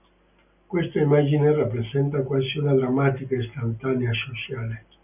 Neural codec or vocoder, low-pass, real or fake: none; 3.6 kHz; real